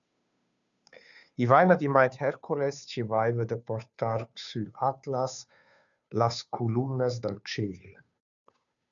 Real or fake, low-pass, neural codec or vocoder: fake; 7.2 kHz; codec, 16 kHz, 2 kbps, FunCodec, trained on Chinese and English, 25 frames a second